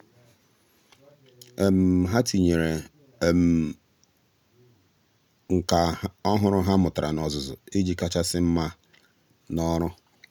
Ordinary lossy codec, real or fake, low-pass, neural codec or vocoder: none; real; 19.8 kHz; none